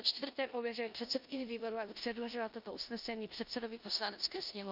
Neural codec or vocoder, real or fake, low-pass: codec, 16 kHz in and 24 kHz out, 0.9 kbps, LongCat-Audio-Codec, four codebook decoder; fake; 5.4 kHz